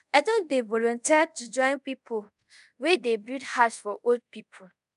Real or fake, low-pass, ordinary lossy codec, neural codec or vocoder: fake; 10.8 kHz; none; codec, 24 kHz, 0.5 kbps, DualCodec